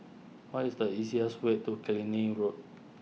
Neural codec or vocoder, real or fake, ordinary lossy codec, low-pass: none; real; none; none